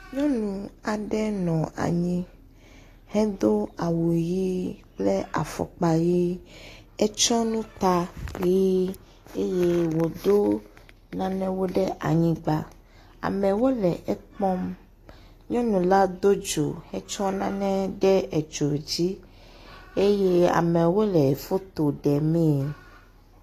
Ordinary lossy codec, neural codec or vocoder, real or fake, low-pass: AAC, 48 kbps; none; real; 14.4 kHz